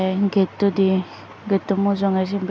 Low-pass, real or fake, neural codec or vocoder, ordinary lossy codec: none; real; none; none